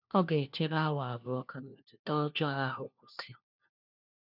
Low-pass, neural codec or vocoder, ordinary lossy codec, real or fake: 5.4 kHz; codec, 16 kHz, 1 kbps, FunCodec, trained on LibriTTS, 50 frames a second; none; fake